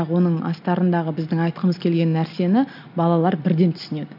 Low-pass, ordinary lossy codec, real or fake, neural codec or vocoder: 5.4 kHz; none; real; none